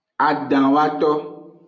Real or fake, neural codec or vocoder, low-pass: real; none; 7.2 kHz